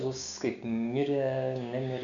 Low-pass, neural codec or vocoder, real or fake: 7.2 kHz; codec, 16 kHz, 6 kbps, DAC; fake